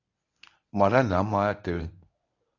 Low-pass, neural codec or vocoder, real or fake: 7.2 kHz; codec, 24 kHz, 0.9 kbps, WavTokenizer, medium speech release version 1; fake